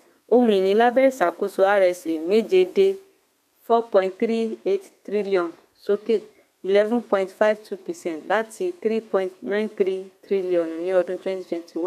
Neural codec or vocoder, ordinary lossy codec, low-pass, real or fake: codec, 32 kHz, 1.9 kbps, SNAC; none; 14.4 kHz; fake